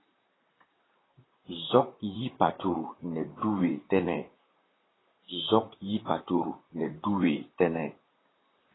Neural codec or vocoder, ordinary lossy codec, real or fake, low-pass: vocoder, 44.1 kHz, 128 mel bands, Pupu-Vocoder; AAC, 16 kbps; fake; 7.2 kHz